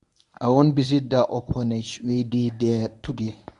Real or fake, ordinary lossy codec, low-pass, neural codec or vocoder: fake; none; 10.8 kHz; codec, 24 kHz, 0.9 kbps, WavTokenizer, medium speech release version 1